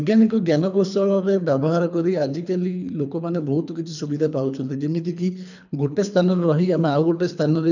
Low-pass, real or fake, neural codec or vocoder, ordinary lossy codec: 7.2 kHz; fake; codec, 24 kHz, 3 kbps, HILCodec; none